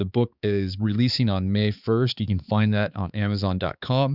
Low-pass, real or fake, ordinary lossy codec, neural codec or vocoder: 5.4 kHz; fake; Opus, 64 kbps; codec, 16 kHz, 4 kbps, X-Codec, HuBERT features, trained on balanced general audio